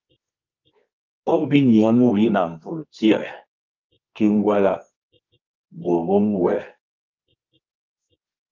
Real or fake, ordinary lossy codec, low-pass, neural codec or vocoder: fake; Opus, 24 kbps; 7.2 kHz; codec, 24 kHz, 0.9 kbps, WavTokenizer, medium music audio release